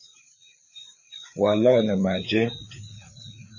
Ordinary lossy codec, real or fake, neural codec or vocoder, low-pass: MP3, 32 kbps; fake; codec, 16 kHz, 4 kbps, FreqCodec, larger model; 7.2 kHz